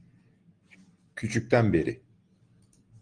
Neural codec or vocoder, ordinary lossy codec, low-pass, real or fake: none; Opus, 24 kbps; 9.9 kHz; real